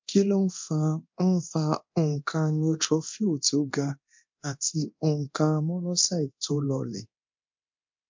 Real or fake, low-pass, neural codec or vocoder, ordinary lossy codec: fake; 7.2 kHz; codec, 24 kHz, 0.9 kbps, DualCodec; MP3, 48 kbps